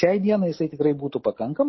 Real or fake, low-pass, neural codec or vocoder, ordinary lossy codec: real; 7.2 kHz; none; MP3, 24 kbps